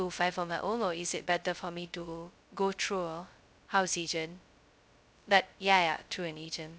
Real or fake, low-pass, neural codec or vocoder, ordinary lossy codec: fake; none; codec, 16 kHz, 0.2 kbps, FocalCodec; none